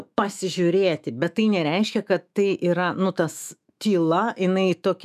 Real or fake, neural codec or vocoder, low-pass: fake; autoencoder, 48 kHz, 128 numbers a frame, DAC-VAE, trained on Japanese speech; 14.4 kHz